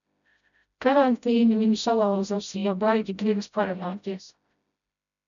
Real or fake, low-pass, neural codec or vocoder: fake; 7.2 kHz; codec, 16 kHz, 0.5 kbps, FreqCodec, smaller model